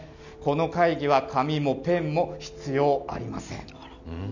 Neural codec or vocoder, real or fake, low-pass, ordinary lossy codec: none; real; 7.2 kHz; none